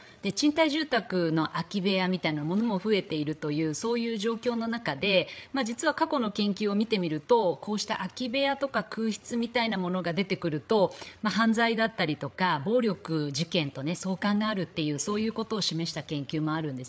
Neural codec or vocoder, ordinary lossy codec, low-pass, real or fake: codec, 16 kHz, 8 kbps, FreqCodec, larger model; none; none; fake